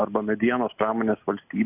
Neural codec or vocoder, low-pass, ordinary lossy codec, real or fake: none; 3.6 kHz; AAC, 32 kbps; real